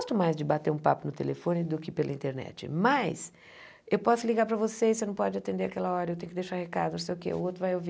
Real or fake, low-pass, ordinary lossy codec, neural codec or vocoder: real; none; none; none